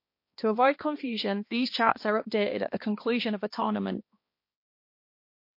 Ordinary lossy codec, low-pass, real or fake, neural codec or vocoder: MP3, 32 kbps; 5.4 kHz; fake; codec, 16 kHz, 2 kbps, X-Codec, HuBERT features, trained on balanced general audio